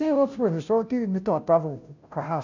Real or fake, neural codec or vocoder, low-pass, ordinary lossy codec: fake; codec, 16 kHz, 0.5 kbps, FunCodec, trained on Chinese and English, 25 frames a second; 7.2 kHz; none